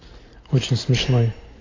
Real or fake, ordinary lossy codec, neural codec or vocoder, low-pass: real; AAC, 32 kbps; none; 7.2 kHz